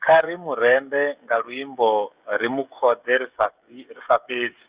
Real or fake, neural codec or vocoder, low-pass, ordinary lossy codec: fake; codec, 16 kHz, 16 kbps, FreqCodec, smaller model; 3.6 kHz; Opus, 64 kbps